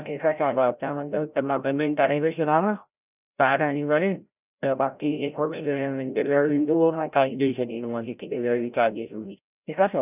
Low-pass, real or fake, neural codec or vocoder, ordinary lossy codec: 3.6 kHz; fake; codec, 16 kHz, 0.5 kbps, FreqCodec, larger model; none